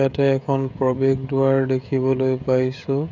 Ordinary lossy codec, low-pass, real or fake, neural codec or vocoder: none; 7.2 kHz; fake; codec, 16 kHz, 16 kbps, FreqCodec, smaller model